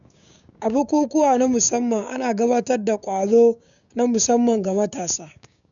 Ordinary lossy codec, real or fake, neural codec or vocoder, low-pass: none; fake; codec, 16 kHz, 6 kbps, DAC; 7.2 kHz